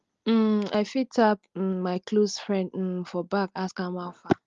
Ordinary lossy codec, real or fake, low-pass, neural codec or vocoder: Opus, 32 kbps; real; 7.2 kHz; none